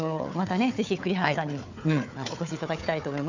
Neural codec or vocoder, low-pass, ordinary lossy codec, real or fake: codec, 16 kHz, 4 kbps, FunCodec, trained on Chinese and English, 50 frames a second; 7.2 kHz; none; fake